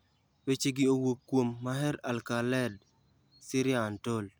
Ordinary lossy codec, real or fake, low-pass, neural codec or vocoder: none; real; none; none